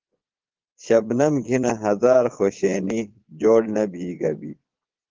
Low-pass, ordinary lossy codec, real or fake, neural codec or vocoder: 7.2 kHz; Opus, 16 kbps; fake; vocoder, 22.05 kHz, 80 mel bands, Vocos